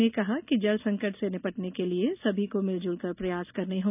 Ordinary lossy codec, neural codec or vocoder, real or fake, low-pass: none; none; real; 3.6 kHz